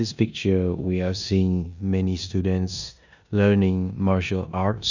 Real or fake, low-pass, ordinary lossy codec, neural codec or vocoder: fake; 7.2 kHz; none; codec, 16 kHz in and 24 kHz out, 0.9 kbps, LongCat-Audio-Codec, four codebook decoder